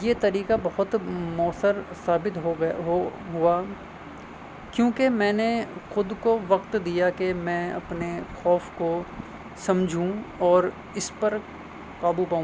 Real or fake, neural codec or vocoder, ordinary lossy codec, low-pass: real; none; none; none